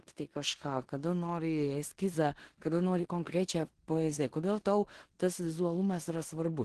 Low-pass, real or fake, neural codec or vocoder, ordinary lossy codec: 10.8 kHz; fake; codec, 16 kHz in and 24 kHz out, 0.9 kbps, LongCat-Audio-Codec, four codebook decoder; Opus, 16 kbps